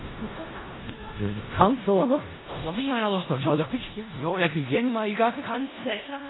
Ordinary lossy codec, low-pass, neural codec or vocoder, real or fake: AAC, 16 kbps; 7.2 kHz; codec, 16 kHz in and 24 kHz out, 0.4 kbps, LongCat-Audio-Codec, four codebook decoder; fake